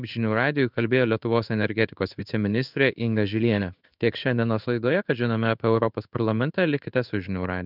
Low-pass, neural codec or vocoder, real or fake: 5.4 kHz; codec, 16 kHz in and 24 kHz out, 1 kbps, XY-Tokenizer; fake